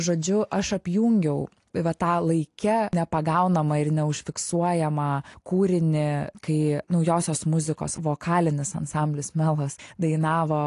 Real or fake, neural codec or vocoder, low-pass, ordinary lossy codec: real; none; 10.8 kHz; AAC, 48 kbps